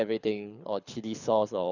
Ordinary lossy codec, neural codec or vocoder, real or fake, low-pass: none; codec, 24 kHz, 6 kbps, HILCodec; fake; 7.2 kHz